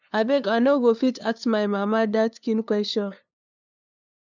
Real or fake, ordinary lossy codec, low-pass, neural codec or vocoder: fake; none; 7.2 kHz; codec, 16 kHz, 2 kbps, FunCodec, trained on LibriTTS, 25 frames a second